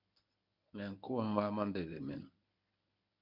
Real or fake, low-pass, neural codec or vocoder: fake; 5.4 kHz; codec, 24 kHz, 0.9 kbps, WavTokenizer, medium speech release version 1